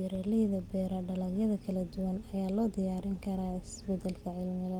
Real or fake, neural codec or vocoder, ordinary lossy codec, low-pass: real; none; Opus, 24 kbps; 19.8 kHz